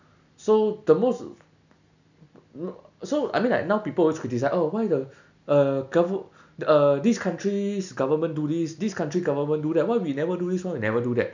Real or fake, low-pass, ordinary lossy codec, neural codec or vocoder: real; 7.2 kHz; none; none